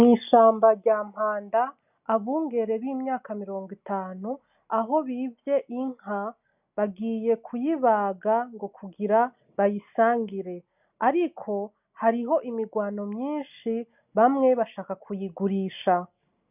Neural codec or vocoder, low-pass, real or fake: none; 3.6 kHz; real